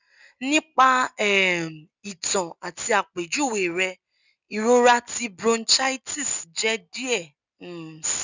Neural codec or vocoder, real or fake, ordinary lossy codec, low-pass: none; real; none; 7.2 kHz